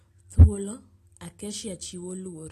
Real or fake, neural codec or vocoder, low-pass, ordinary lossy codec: real; none; 14.4 kHz; AAC, 48 kbps